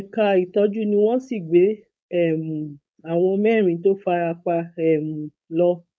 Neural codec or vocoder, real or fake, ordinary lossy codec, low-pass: codec, 16 kHz, 4.8 kbps, FACodec; fake; none; none